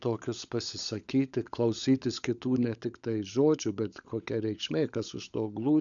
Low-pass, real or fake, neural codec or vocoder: 7.2 kHz; fake; codec, 16 kHz, 16 kbps, FunCodec, trained on LibriTTS, 50 frames a second